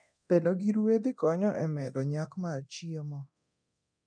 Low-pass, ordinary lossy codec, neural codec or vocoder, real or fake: 9.9 kHz; none; codec, 24 kHz, 0.9 kbps, DualCodec; fake